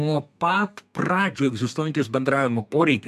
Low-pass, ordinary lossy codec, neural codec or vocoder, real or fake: 14.4 kHz; MP3, 96 kbps; codec, 32 kHz, 1.9 kbps, SNAC; fake